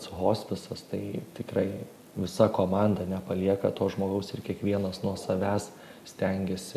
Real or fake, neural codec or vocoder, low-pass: real; none; 14.4 kHz